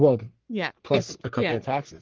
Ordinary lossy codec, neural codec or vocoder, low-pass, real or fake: Opus, 16 kbps; codec, 44.1 kHz, 3.4 kbps, Pupu-Codec; 7.2 kHz; fake